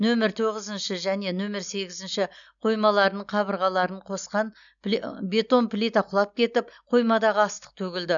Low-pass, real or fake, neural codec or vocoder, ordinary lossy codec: 7.2 kHz; real; none; none